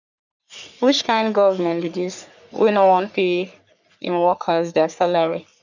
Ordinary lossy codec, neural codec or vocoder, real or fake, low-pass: none; codec, 44.1 kHz, 3.4 kbps, Pupu-Codec; fake; 7.2 kHz